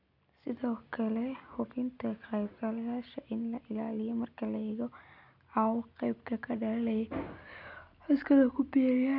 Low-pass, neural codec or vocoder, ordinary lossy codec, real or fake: 5.4 kHz; none; none; real